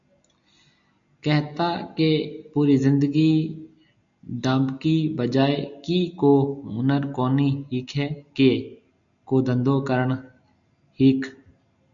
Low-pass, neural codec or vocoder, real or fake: 7.2 kHz; none; real